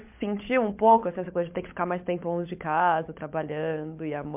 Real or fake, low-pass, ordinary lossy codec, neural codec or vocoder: fake; 3.6 kHz; MP3, 32 kbps; codec, 16 kHz, 16 kbps, FunCodec, trained on LibriTTS, 50 frames a second